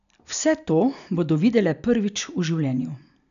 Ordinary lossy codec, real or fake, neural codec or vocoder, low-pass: none; real; none; 7.2 kHz